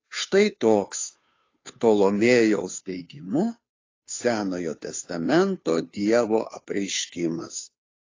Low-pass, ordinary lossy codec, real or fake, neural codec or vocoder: 7.2 kHz; AAC, 32 kbps; fake; codec, 16 kHz, 2 kbps, FunCodec, trained on Chinese and English, 25 frames a second